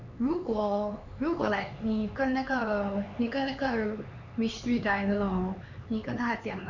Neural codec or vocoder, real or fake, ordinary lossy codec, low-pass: codec, 16 kHz, 4 kbps, X-Codec, HuBERT features, trained on LibriSpeech; fake; none; 7.2 kHz